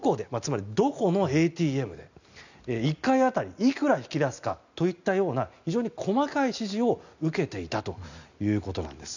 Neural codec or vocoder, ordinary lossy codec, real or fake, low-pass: none; none; real; 7.2 kHz